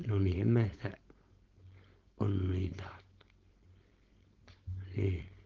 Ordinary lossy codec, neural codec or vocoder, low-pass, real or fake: Opus, 16 kbps; codec, 16 kHz, 4.8 kbps, FACodec; 7.2 kHz; fake